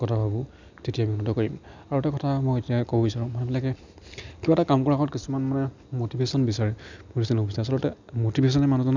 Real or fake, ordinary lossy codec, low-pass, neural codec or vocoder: real; none; 7.2 kHz; none